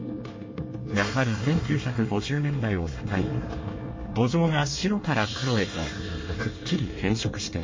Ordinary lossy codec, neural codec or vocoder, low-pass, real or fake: MP3, 32 kbps; codec, 24 kHz, 1 kbps, SNAC; 7.2 kHz; fake